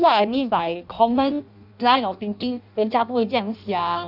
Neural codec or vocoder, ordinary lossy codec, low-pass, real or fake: codec, 16 kHz in and 24 kHz out, 0.6 kbps, FireRedTTS-2 codec; none; 5.4 kHz; fake